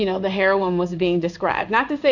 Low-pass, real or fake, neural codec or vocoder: 7.2 kHz; fake; codec, 16 kHz in and 24 kHz out, 1 kbps, XY-Tokenizer